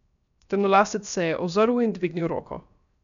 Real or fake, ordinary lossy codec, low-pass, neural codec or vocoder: fake; none; 7.2 kHz; codec, 16 kHz, 0.7 kbps, FocalCodec